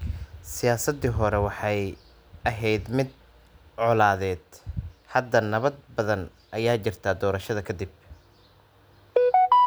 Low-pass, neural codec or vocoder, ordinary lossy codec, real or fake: none; none; none; real